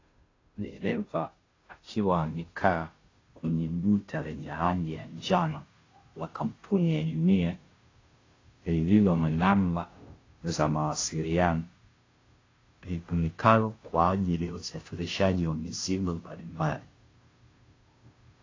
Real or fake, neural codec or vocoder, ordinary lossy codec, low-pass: fake; codec, 16 kHz, 0.5 kbps, FunCodec, trained on Chinese and English, 25 frames a second; AAC, 32 kbps; 7.2 kHz